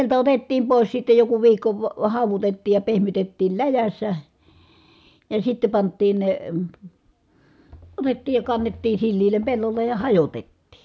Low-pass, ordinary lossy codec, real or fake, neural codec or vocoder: none; none; real; none